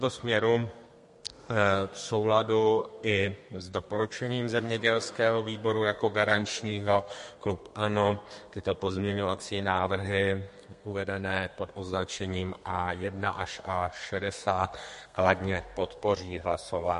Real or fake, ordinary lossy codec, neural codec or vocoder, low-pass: fake; MP3, 48 kbps; codec, 32 kHz, 1.9 kbps, SNAC; 14.4 kHz